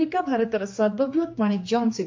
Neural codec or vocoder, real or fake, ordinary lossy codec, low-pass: codec, 16 kHz, 2 kbps, X-Codec, HuBERT features, trained on general audio; fake; MP3, 48 kbps; 7.2 kHz